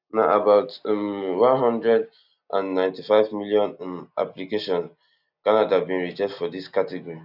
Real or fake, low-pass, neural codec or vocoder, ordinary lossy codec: real; 5.4 kHz; none; none